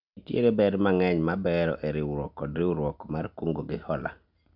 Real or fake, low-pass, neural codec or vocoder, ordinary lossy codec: real; 5.4 kHz; none; none